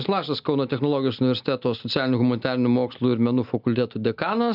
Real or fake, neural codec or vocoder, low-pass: real; none; 5.4 kHz